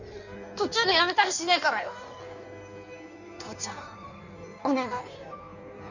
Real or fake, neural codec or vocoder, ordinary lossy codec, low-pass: fake; codec, 16 kHz in and 24 kHz out, 1.1 kbps, FireRedTTS-2 codec; none; 7.2 kHz